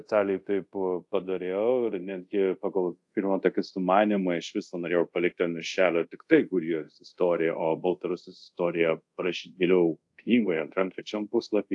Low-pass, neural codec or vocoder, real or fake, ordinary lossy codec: 10.8 kHz; codec, 24 kHz, 0.5 kbps, DualCodec; fake; AAC, 64 kbps